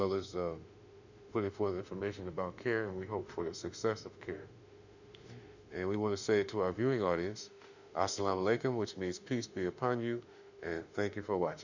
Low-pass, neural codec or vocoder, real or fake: 7.2 kHz; autoencoder, 48 kHz, 32 numbers a frame, DAC-VAE, trained on Japanese speech; fake